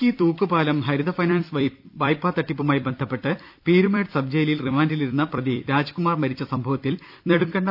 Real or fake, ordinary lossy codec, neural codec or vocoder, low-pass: fake; none; vocoder, 44.1 kHz, 128 mel bands every 256 samples, BigVGAN v2; 5.4 kHz